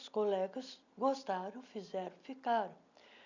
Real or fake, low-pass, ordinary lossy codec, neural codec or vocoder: real; 7.2 kHz; none; none